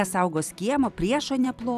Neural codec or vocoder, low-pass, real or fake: vocoder, 44.1 kHz, 128 mel bands every 256 samples, BigVGAN v2; 14.4 kHz; fake